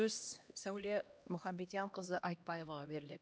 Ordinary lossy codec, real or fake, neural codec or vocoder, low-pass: none; fake; codec, 16 kHz, 1 kbps, X-Codec, HuBERT features, trained on LibriSpeech; none